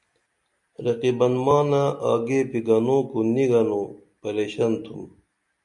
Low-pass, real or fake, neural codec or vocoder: 10.8 kHz; real; none